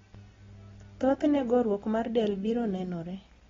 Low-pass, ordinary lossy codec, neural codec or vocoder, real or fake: 7.2 kHz; AAC, 24 kbps; none; real